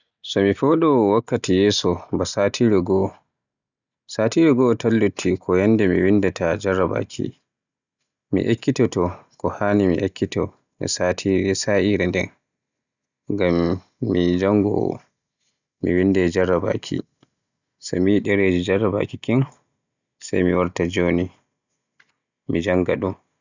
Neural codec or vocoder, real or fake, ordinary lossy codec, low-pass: none; real; none; 7.2 kHz